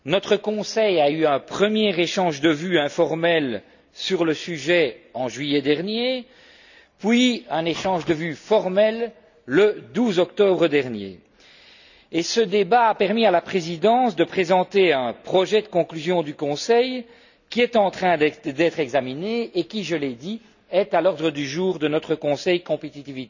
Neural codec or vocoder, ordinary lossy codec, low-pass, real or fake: none; none; 7.2 kHz; real